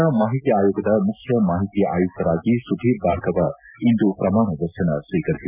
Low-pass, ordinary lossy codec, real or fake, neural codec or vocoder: 3.6 kHz; none; real; none